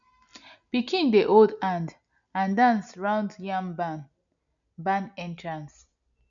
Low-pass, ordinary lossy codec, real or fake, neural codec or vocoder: 7.2 kHz; none; real; none